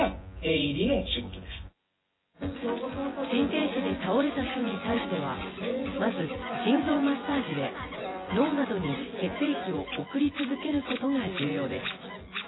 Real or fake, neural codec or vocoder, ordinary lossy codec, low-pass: fake; vocoder, 24 kHz, 100 mel bands, Vocos; AAC, 16 kbps; 7.2 kHz